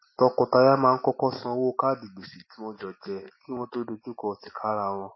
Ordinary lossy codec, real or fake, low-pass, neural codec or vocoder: MP3, 24 kbps; real; 7.2 kHz; none